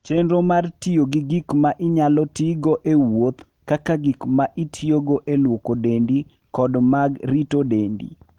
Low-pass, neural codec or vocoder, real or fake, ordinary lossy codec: 19.8 kHz; none; real; Opus, 24 kbps